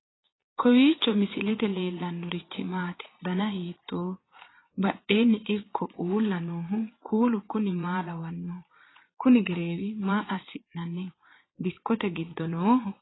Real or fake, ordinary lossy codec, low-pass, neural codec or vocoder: fake; AAC, 16 kbps; 7.2 kHz; vocoder, 22.05 kHz, 80 mel bands, Vocos